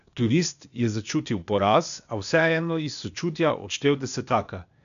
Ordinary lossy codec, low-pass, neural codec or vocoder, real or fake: none; 7.2 kHz; codec, 16 kHz, 0.8 kbps, ZipCodec; fake